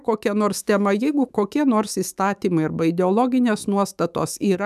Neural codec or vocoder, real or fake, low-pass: autoencoder, 48 kHz, 128 numbers a frame, DAC-VAE, trained on Japanese speech; fake; 14.4 kHz